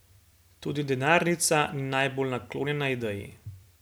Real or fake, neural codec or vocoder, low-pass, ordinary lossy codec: real; none; none; none